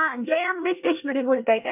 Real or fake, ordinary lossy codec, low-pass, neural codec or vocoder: fake; none; 3.6 kHz; codec, 24 kHz, 1 kbps, SNAC